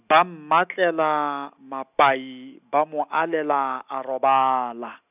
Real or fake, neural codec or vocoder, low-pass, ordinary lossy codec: real; none; 3.6 kHz; none